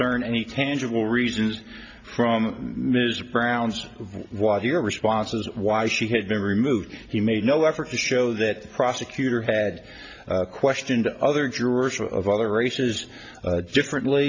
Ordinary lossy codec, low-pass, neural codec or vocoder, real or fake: AAC, 48 kbps; 7.2 kHz; none; real